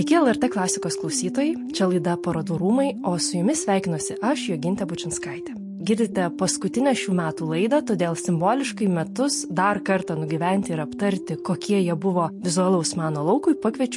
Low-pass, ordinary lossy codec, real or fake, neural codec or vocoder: 10.8 kHz; MP3, 48 kbps; real; none